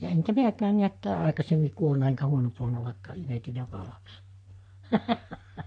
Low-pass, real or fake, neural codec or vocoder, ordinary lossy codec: 9.9 kHz; fake; codec, 44.1 kHz, 3.4 kbps, Pupu-Codec; MP3, 64 kbps